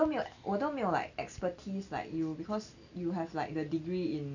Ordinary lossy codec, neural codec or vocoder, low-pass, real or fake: none; none; 7.2 kHz; real